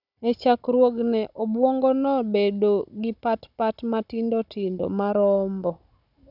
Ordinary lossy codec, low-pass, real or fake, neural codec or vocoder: none; 5.4 kHz; fake; codec, 16 kHz, 16 kbps, FunCodec, trained on Chinese and English, 50 frames a second